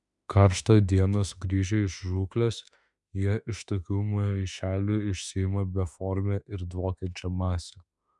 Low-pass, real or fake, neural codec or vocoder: 10.8 kHz; fake; autoencoder, 48 kHz, 32 numbers a frame, DAC-VAE, trained on Japanese speech